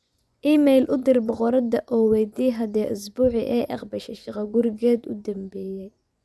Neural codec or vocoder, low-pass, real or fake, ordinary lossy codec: vocoder, 24 kHz, 100 mel bands, Vocos; none; fake; none